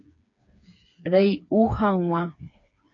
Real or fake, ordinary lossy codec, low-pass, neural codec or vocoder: fake; AAC, 48 kbps; 7.2 kHz; codec, 16 kHz, 4 kbps, FreqCodec, smaller model